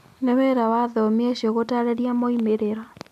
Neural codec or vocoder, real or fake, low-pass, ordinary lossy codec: none; real; 14.4 kHz; MP3, 96 kbps